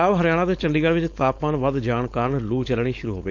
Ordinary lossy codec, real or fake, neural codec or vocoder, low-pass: none; fake; codec, 16 kHz, 4.8 kbps, FACodec; 7.2 kHz